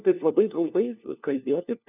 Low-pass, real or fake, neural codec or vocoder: 3.6 kHz; fake; codec, 16 kHz, 1 kbps, FunCodec, trained on LibriTTS, 50 frames a second